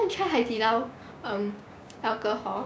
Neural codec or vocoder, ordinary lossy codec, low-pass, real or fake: codec, 16 kHz, 6 kbps, DAC; none; none; fake